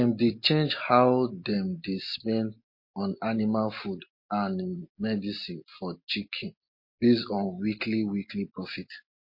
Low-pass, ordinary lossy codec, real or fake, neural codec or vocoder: 5.4 kHz; MP3, 32 kbps; real; none